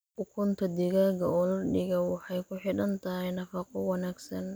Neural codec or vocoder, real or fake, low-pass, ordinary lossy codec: none; real; none; none